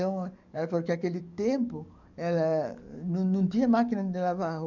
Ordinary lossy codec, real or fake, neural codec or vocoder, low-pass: none; fake; codec, 44.1 kHz, 7.8 kbps, DAC; 7.2 kHz